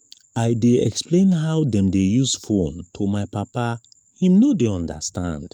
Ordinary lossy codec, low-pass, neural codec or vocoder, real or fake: none; 19.8 kHz; codec, 44.1 kHz, 7.8 kbps, DAC; fake